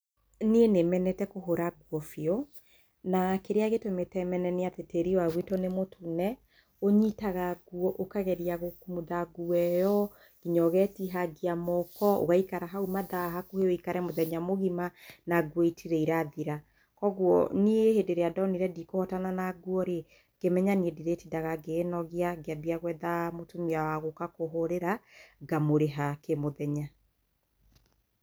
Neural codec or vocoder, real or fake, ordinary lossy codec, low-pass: none; real; none; none